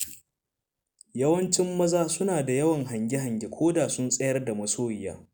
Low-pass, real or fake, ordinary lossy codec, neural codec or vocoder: none; real; none; none